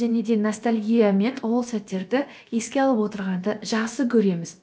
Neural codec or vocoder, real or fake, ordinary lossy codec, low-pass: codec, 16 kHz, about 1 kbps, DyCAST, with the encoder's durations; fake; none; none